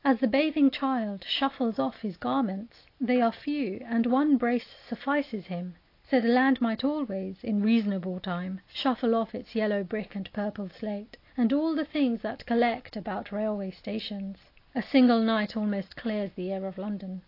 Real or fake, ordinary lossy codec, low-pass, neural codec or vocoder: real; AAC, 32 kbps; 5.4 kHz; none